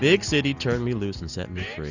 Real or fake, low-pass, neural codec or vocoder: real; 7.2 kHz; none